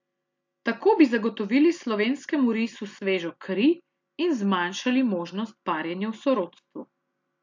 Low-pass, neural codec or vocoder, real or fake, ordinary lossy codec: 7.2 kHz; none; real; MP3, 48 kbps